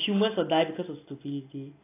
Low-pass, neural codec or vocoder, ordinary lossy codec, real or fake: 3.6 kHz; none; AAC, 24 kbps; real